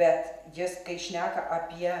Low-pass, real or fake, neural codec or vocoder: 14.4 kHz; real; none